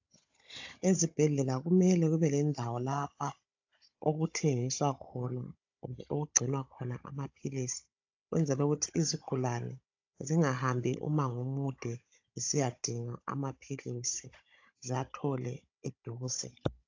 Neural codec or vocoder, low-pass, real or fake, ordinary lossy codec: codec, 16 kHz, 16 kbps, FunCodec, trained on Chinese and English, 50 frames a second; 7.2 kHz; fake; AAC, 48 kbps